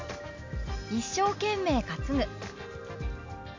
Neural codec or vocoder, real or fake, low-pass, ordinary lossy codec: none; real; 7.2 kHz; MP3, 48 kbps